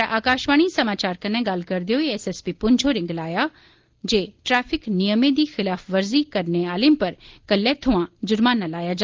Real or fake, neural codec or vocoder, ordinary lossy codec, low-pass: real; none; Opus, 16 kbps; 7.2 kHz